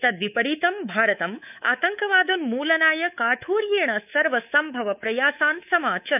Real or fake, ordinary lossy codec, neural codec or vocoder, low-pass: fake; none; codec, 24 kHz, 3.1 kbps, DualCodec; 3.6 kHz